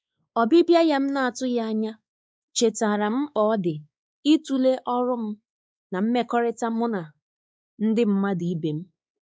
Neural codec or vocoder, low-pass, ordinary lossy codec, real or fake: codec, 16 kHz, 4 kbps, X-Codec, WavLM features, trained on Multilingual LibriSpeech; none; none; fake